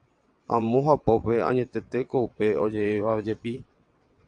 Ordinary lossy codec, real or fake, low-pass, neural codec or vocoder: AAC, 64 kbps; fake; 9.9 kHz; vocoder, 22.05 kHz, 80 mel bands, WaveNeXt